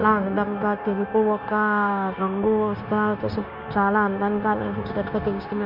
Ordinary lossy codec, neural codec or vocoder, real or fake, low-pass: none; codec, 16 kHz, 0.9 kbps, LongCat-Audio-Codec; fake; 5.4 kHz